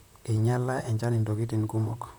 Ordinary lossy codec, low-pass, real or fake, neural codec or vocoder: none; none; fake; vocoder, 44.1 kHz, 128 mel bands, Pupu-Vocoder